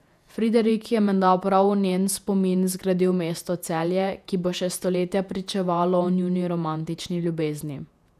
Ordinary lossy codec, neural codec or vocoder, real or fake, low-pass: none; vocoder, 48 kHz, 128 mel bands, Vocos; fake; 14.4 kHz